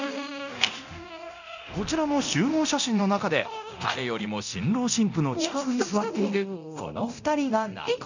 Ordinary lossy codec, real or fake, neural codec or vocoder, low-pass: none; fake; codec, 24 kHz, 0.9 kbps, DualCodec; 7.2 kHz